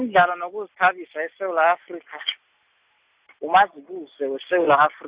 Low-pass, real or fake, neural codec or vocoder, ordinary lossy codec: 3.6 kHz; real; none; Opus, 64 kbps